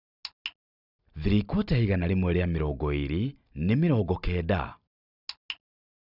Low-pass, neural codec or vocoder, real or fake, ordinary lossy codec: 5.4 kHz; none; real; none